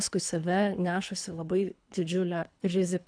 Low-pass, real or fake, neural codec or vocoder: 9.9 kHz; fake; codec, 24 kHz, 3 kbps, HILCodec